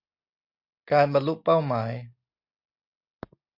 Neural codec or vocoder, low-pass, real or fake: none; 5.4 kHz; real